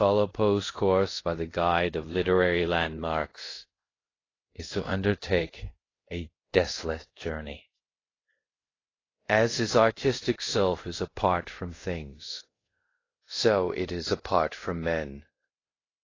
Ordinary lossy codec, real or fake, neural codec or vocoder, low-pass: AAC, 32 kbps; fake; codec, 24 kHz, 0.5 kbps, DualCodec; 7.2 kHz